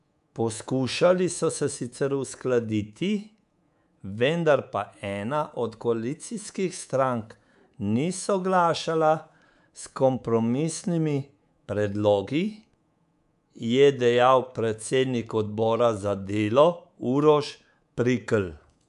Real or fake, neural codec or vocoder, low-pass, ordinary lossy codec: fake; codec, 24 kHz, 3.1 kbps, DualCodec; 10.8 kHz; none